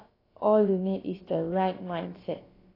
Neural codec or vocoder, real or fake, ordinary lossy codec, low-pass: codec, 16 kHz, about 1 kbps, DyCAST, with the encoder's durations; fake; AAC, 24 kbps; 5.4 kHz